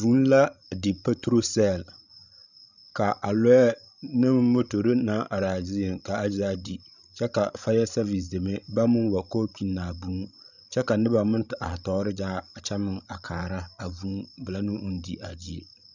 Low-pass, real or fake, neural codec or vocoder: 7.2 kHz; fake; codec, 16 kHz, 16 kbps, FreqCodec, larger model